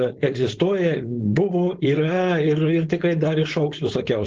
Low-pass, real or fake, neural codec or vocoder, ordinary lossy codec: 7.2 kHz; fake; codec, 16 kHz, 4.8 kbps, FACodec; Opus, 16 kbps